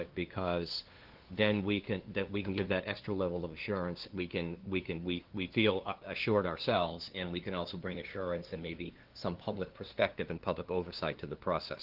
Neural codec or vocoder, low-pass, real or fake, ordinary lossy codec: codec, 16 kHz, 2 kbps, FunCodec, trained on LibriTTS, 25 frames a second; 5.4 kHz; fake; Opus, 16 kbps